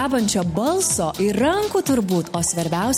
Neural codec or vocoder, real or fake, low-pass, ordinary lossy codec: none; real; 19.8 kHz; MP3, 64 kbps